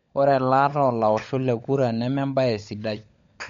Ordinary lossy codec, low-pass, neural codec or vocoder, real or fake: MP3, 48 kbps; 7.2 kHz; codec, 16 kHz, 16 kbps, FunCodec, trained on LibriTTS, 50 frames a second; fake